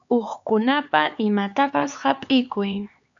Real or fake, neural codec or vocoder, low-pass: fake; codec, 16 kHz, 4 kbps, X-Codec, HuBERT features, trained on LibriSpeech; 7.2 kHz